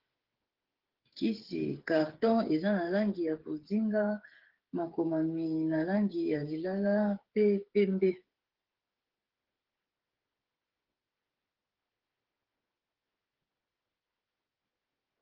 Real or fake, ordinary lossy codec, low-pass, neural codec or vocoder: fake; Opus, 16 kbps; 5.4 kHz; codec, 16 kHz, 8 kbps, FreqCodec, smaller model